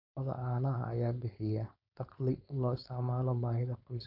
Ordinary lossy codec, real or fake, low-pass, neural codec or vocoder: none; fake; 5.4 kHz; codec, 16 kHz, 4.8 kbps, FACodec